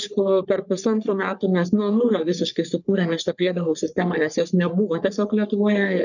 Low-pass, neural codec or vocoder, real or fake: 7.2 kHz; codec, 44.1 kHz, 3.4 kbps, Pupu-Codec; fake